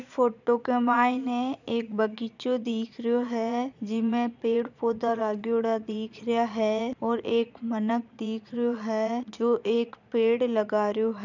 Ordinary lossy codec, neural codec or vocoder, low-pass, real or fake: none; vocoder, 22.05 kHz, 80 mel bands, Vocos; 7.2 kHz; fake